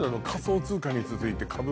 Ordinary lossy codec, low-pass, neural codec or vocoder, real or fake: none; none; none; real